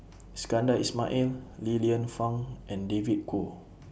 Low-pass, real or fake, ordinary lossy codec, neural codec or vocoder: none; real; none; none